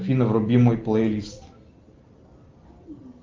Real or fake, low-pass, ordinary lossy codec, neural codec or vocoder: real; 7.2 kHz; Opus, 32 kbps; none